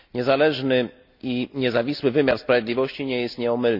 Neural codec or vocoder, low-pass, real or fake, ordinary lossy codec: none; 5.4 kHz; real; none